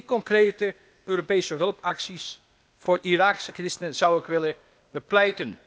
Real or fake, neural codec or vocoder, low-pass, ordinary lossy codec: fake; codec, 16 kHz, 0.8 kbps, ZipCodec; none; none